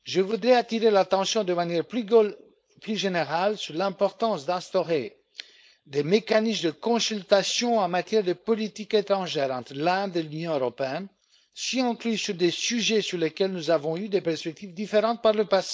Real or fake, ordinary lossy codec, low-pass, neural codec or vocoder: fake; none; none; codec, 16 kHz, 4.8 kbps, FACodec